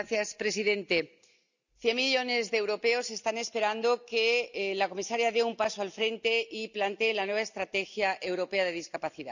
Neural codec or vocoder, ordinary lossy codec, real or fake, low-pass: none; none; real; 7.2 kHz